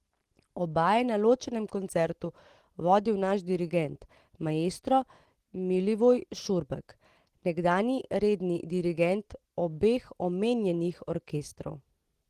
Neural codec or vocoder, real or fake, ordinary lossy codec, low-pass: none; real; Opus, 16 kbps; 14.4 kHz